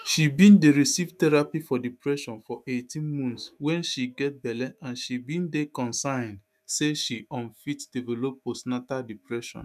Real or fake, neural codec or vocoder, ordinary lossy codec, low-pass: fake; autoencoder, 48 kHz, 128 numbers a frame, DAC-VAE, trained on Japanese speech; none; 14.4 kHz